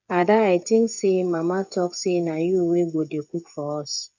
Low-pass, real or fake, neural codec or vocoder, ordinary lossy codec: 7.2 kHz; fake; codec, 16 kHz, 8 kbps, FreqCodec, smaller model; none